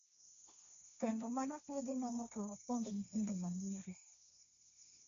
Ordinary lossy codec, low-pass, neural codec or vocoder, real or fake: none; 7.2 kHz; codec, 16 kHz, 1.1 kbps, Voila-Tokenizer; fake